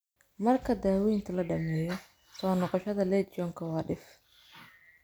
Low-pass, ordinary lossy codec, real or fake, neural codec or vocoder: none; none; real; none